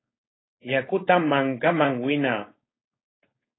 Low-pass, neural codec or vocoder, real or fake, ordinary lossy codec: 7.2 kHz; codec, 16 kHz, 4.8 kbps, FACodec; fake; AAC, 16 kbps